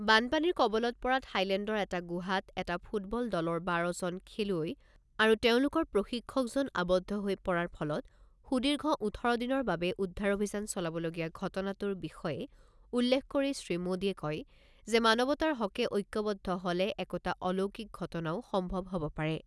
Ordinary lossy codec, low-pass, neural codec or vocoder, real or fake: none; none; none; real